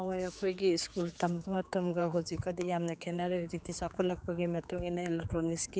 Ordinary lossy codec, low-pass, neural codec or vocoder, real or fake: none; none; codec, 16 kHz, 4 kbps, X-Codec, HuBERT features, trained on general audio; fake